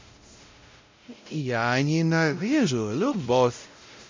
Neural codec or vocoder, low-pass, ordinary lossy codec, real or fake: codec, 16 kHz, 0.5 kbps, X-Codec, WavLM features, trained on Multilingual LibriSpeech; 7.2 kHz; MP3, 64 kbps; fake